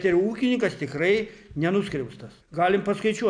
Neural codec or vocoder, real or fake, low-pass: none; real; 9.9 kHz